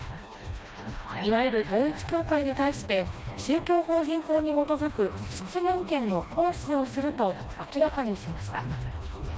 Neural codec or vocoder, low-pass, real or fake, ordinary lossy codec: codec, 16 kHz, 1 kbps, FreqCodec, smaller model; none; fake; none